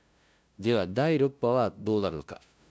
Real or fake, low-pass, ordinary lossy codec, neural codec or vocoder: fake; none; none; codec, 16 kHz, 0.5 kbps, FunCodec, trained on LibriTTS, 25 frames a second